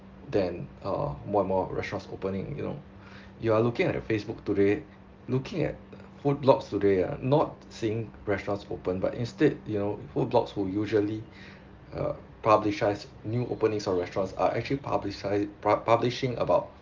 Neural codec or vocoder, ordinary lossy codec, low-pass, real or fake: none; Opus, 32 kbps; 7.2 kHz; real